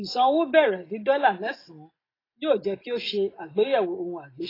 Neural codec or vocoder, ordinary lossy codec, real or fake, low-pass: none; AAC, 24 kbps; real; 5.4 kHz